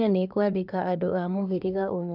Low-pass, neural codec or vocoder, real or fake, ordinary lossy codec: 5.4 kHz; codec, 24 kHz, 1 kbps, SNAC; fake; none